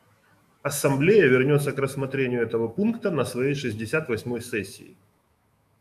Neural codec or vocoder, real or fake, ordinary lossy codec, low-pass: autoencoder, 48 kHz, 128 numbers a frame, DAC-VAE, trained on Japanese speech; fake; MP3, 96 kbps; 14.4 kHz